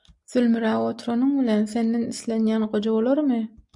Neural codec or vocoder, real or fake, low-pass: none; real; 10.8 kHz